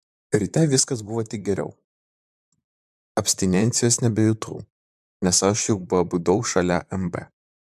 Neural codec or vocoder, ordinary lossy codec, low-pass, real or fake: vocoder, 44.1 kHz, 128 mel bands, Pupu-Vocoder; MP3, 96 kbps; 14.4 kHz; fake